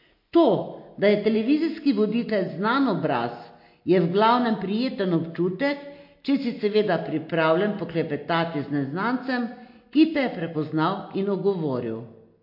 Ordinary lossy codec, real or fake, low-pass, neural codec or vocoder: MP3, 32 kbps; real; 5.4 kHz; none